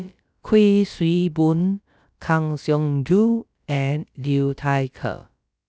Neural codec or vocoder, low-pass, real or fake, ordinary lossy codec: codec, 16 kHz, about 1 kbps, DyCAST, with the encoder's durations; none; fake; none